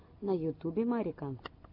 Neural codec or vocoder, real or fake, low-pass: none; real; 5.4 kHz